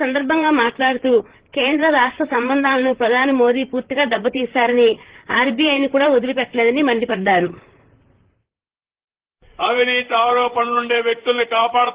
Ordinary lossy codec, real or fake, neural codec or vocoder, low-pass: Opus, 16 kbps; fake; vocoder, 44.1 kHz, 128 mel bands, Pupu-Vocoder; 3.6 kHz